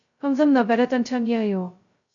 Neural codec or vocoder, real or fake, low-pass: codec, 16 kHz, 0.2 kbps, FocalCodec; fake; 7.2 kHz